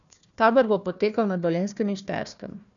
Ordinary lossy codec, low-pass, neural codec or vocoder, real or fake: none; 7.2 kHz; codec, 16 kHz, 1 kbps, FunCodec, trained on Chinese and English, 50 frames a second; fake